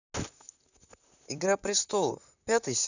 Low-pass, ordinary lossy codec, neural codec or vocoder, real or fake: 7.2 kHz; none; vocoder, 44.1 kHz, 128 mel bands, Pupu-Vocoder; fake